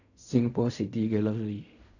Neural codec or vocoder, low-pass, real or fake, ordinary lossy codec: codec, 16 kHz in and 24 kHz out, 0.4 kbps, LongCat-Audio-Codec, fine tuned four codebook decoder; 7.2 kHz; fake; none